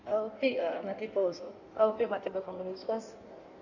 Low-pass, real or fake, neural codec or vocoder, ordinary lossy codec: 7.2 kHz; fake; codec, 16 kHz in and 24 kHz out, 1.1 kbps, FireRedTTS-2 codec; none